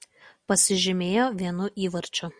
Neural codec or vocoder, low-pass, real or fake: none; 9.9 kHz; real